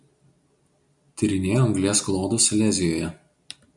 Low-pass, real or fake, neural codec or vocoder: 10.8 kHz; real; none